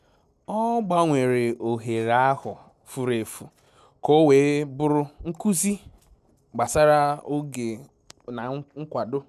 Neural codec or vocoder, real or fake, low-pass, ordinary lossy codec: none; real; 14.4 kHz; none